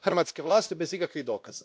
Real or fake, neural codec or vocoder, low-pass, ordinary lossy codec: fake; codec, 16 kHz, 1 kbps, X-Codec, WavLM features, trained on Multilingual LibriSpeech; none; none